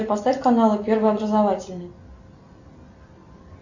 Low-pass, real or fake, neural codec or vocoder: 7.2 kHz; real; none